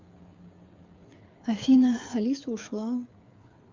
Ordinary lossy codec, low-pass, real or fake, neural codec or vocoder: Opus, 32 kbps; 7.2 kHz; fake; codec, 16 kHz, 8 kbps, FreqCodec, smaller model